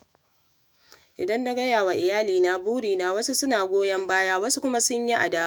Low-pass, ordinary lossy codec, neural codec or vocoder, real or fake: none; none; autoencoder, 48 kHz, 128 numbers a frame, DAC-VAE, trained on Japanese speech; fake